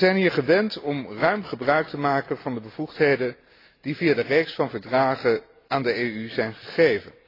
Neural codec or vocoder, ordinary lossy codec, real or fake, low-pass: none; AAC, 24 kbps; real; 5.4 kHz